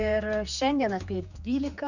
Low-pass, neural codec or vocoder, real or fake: 7.2 kHz; codec, 16 kHz, 4 kbps, X-Codec, HuBERT features, trained on general audio; fake